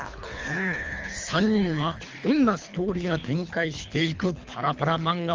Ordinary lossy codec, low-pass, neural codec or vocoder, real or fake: Opus, 32 kbps; 7.2 kHz; codec, 24 kHz, 3 kbps, HILCodec; fake